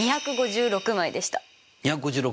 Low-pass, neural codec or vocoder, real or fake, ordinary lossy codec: none; none; real; none